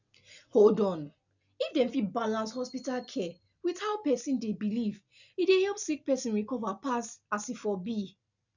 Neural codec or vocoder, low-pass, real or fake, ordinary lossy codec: none; 7.2 kHz; real; none